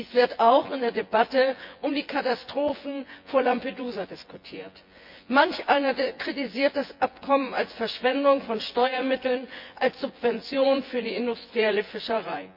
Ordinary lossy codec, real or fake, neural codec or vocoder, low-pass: MP3, 48 kbps; fake; vocoder, 24 kHz, 100 mel bands, Vocos; 5.4 kHz